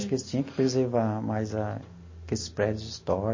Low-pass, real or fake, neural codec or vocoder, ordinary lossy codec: 7.2 kHz; real; none; MP3, 32 kbps